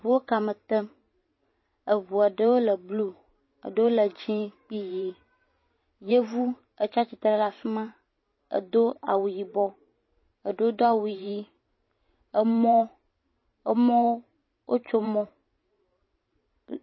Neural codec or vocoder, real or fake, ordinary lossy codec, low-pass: vocoder, 44.1 kHz, 128 mel bands every 512 samples, BigVGAN v2; fake; MP3, 24 kbps; 7.2 kHz